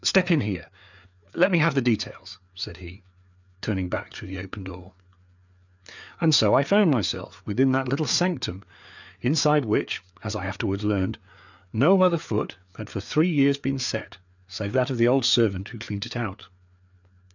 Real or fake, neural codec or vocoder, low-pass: fake; codec, 16 kHz, 4 kbps, FreqCodec, larger model; 7.2 kHz